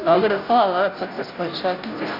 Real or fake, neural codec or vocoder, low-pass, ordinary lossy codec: fake; codec, 16 kHz, 0.5 kbps, FunCodec, trained on Chinese and English, 25 frames a second; 5.4 kHz; none